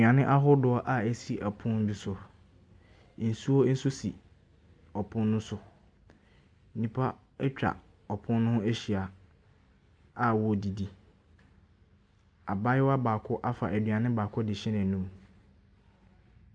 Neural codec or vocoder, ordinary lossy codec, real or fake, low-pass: none; MP3, 96 kbps; real; 9.9 kHz